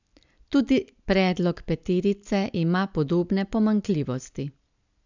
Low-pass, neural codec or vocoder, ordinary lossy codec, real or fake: 7.2 kHz; none; none; real